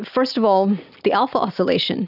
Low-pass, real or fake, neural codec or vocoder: 5.4 kHz; real; none